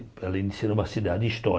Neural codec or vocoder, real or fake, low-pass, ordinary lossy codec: none; real; none; none